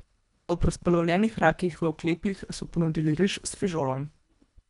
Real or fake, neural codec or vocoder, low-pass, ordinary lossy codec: fake; codec, 24 kHz, 1.5 kbps, HILCodec; 10.8 kHz; none